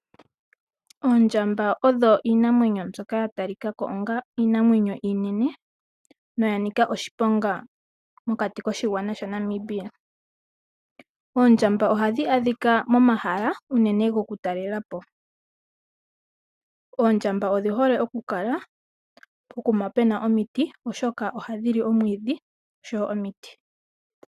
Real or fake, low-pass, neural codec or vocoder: real; 14.4 kHz; none